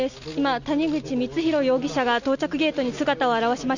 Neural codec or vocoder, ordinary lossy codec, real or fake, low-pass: none; none; real; 7.2 kHz